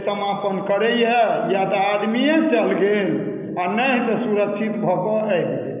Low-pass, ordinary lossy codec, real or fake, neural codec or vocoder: 3.6 kHz; none; real; none